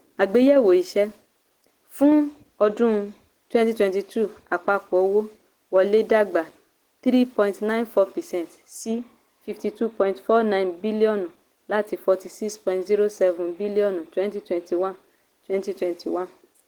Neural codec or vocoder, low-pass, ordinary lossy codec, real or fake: none; 19.8 kHz; Opus, 16 kbps; real